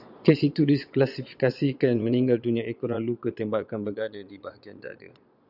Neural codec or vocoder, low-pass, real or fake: vocoder, 22.05 kHz, 80 mel bands, Vocos; 5.4 kHz; fake